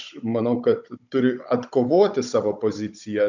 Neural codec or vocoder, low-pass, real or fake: codec, 16 kHz, 16 kbps, FunCodec, trained on Chinese and English, 50 frames a second; 7.2 kHz; fake